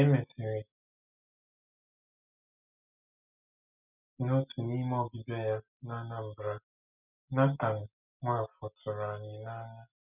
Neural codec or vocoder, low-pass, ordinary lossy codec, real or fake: none; 3.6 kHz; none; real